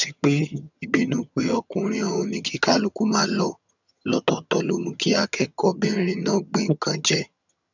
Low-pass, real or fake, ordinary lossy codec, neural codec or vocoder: 7.2 kHz; fake; none; vocoder, 22.05 kHz, 80 mel bands, HiFi-GAN